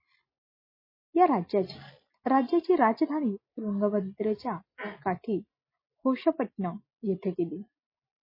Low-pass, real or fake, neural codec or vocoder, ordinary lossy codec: 5.4 kHz; real; none; MP3, 24 kbps